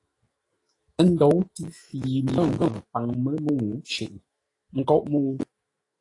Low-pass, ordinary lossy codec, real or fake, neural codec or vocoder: 10.8 kHz; AAC, 32 kbps; fake; autoencoder, 48 kHz, 128 numbers a frame, DAC-VAE, trained on Japanese speech